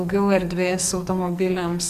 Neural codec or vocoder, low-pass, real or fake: codec, 44.1 kHz, 2.6 kbps, SNAC; 14.4 kHz; fake